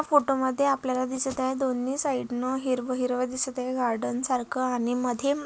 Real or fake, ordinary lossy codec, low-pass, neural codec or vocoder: real; none; none; none